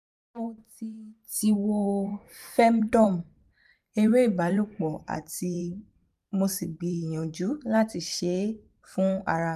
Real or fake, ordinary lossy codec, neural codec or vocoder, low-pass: fake; none; vocoder, 44.1 kHz, 128 mel bands every 512 samples, BigVGAN v2; 14.4 kHz